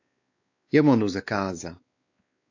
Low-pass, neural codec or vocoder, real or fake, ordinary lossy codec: 7.2 kHz; codec, 16 kHz, 4 kbps, X-Codec, WavLM features, trained on Multilingual LibriSpeech; fake; AAC, 48 kbps